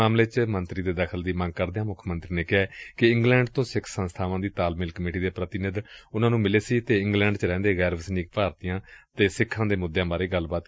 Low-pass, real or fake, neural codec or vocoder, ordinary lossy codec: none; real; none; none